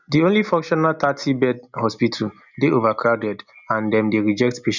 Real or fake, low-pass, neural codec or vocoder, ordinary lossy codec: real; 7.2 kHz; none; none